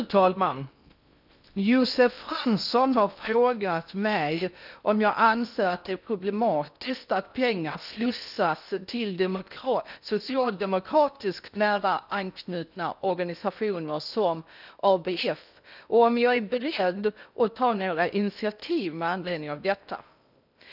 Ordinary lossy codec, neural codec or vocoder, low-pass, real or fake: none; codec, 16 kHz in and 24 kHz out, 0.6 kbps, FocalCodec, streaming, 2048 codes; 5.4 kHz; fake